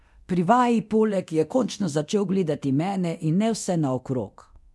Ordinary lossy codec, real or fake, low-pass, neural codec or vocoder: none; fake; none; codec, 24 kHz, 0.9 kbps, DualCodec